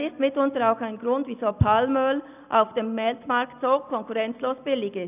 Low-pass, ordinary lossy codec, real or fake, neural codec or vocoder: 3.6 kHz; none; real; none